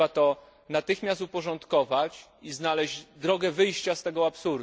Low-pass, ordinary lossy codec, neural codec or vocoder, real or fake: none; none; none; real